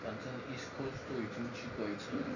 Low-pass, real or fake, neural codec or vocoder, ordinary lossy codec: 7.2 kHz; real; none; none